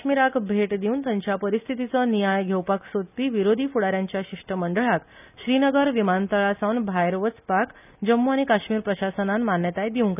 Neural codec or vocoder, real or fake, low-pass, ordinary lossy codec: none; real; 3.6 kHz; none